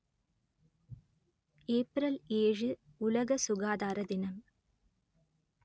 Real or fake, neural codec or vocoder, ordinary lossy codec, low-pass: real; none; none; none